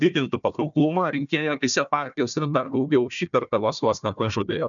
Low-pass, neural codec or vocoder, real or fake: 7.2 kHz; codec, 16 kHz, 1 kbps, FunCodec, trained on Chinese and English, 50 frames a second; fake